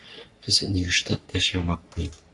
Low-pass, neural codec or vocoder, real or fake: 10.8 kHz; codec, 44.1 kHz, 3.4 kbps, Pupu-Codec; fake